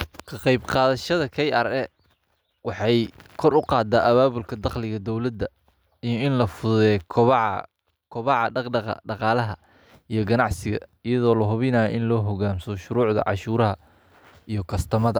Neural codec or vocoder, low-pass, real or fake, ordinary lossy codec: none; none; real; none